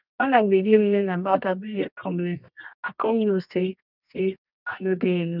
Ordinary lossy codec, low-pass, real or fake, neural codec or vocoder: none; 5.4 kHz; fake; codec, 24 kHz, 0.9 kbps, WavTokenizer, medium music audio release